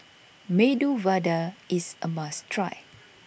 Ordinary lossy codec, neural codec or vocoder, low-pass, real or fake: none; none; none; real